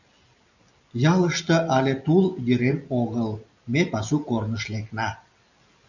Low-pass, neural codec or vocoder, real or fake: 7.2 kHz; none; real